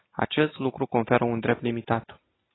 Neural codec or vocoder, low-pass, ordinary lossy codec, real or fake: none; 7.2 kHz; AAC, 16 kbps; real